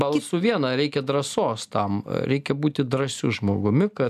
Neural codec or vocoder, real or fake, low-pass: none; real; 14.4 kHz